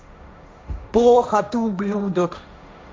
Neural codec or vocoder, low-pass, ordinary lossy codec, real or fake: codec, 16 kHz, 1.1 kbps, Voila-Tokenizer; none; none; fake